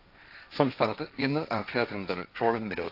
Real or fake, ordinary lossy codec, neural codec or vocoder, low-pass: fake; AAC, 48 kbps; codec, 16 kHz, 1.1 kbps, Voila-Tokenizer; 5.4 kHz